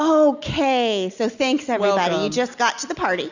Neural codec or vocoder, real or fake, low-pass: none; real; 7.2 kHz